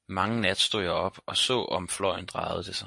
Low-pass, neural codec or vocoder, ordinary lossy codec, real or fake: 10.8 kHz; none; MP3, 48 kbps; real